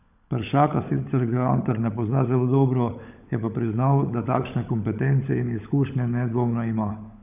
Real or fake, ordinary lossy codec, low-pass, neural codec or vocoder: fake; none; 3.6 kHz; codec, 16 kHz, 16 kbps, FunCodec, trained on LibriTTS, 50 frames a second